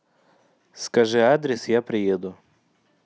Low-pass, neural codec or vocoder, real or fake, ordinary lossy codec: none; none; real; none